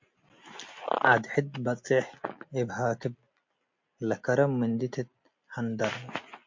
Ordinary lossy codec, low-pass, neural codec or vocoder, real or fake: MP3, 48 kbps; 7.2 kHz; none; real